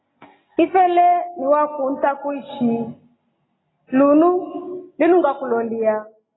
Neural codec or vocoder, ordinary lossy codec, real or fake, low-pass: none; AAC, 16 kbps; real; 7.2 kHz